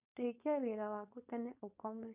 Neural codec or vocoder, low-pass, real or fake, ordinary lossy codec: codec, 16 kHz, 4.8 kbps, FACodec; 3.6 kHz; fake; none